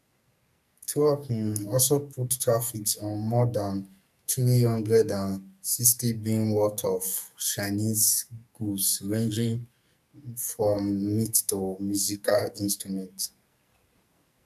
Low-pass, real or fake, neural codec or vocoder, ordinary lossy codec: 14.4 kHz; fake; codec, 44.1 kHz, 2.6 kbps, SNAC; none